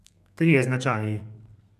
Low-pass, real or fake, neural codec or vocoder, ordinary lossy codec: 14.4 kHz; fake; codec, 32 kHz, 1.9 kbps, SNAC; none